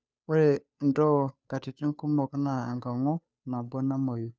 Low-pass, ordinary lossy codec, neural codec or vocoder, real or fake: none; none; codec, 16 kHz, 2 kbps, FunCodec, trained on Chinese and English, 25 frames a second; fake